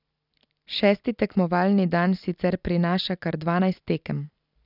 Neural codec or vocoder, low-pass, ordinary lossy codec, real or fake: none; 5.4 kHz; none; real